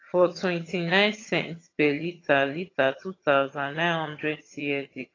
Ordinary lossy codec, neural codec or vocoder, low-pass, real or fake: AAC, 32 kbps; vocoder, 22.05 kHz, 80 mel bands, HiFi-GAN; 7.2 kHz; fake